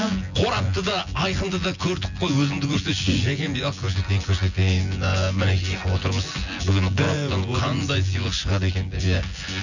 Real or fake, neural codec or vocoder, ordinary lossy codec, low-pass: fake; vocoder, 24 kHz, 100 mel bands, Vocos; none; 7.2 kHz